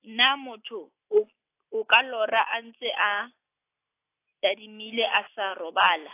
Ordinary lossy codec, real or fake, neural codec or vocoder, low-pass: AAC, 24 kbps; real; none; 3.6 kHz